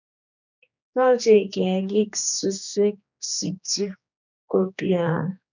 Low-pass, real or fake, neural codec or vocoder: 7.2 kHz; fake; codec, 16 kHz, 2 kbps, X-Codec, HuBERT features, trained on general audio